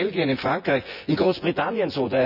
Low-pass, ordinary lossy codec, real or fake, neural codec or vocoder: 5.4 kHz; none; fake; vocoder, 24 kHz, 100 mel bands, Vocos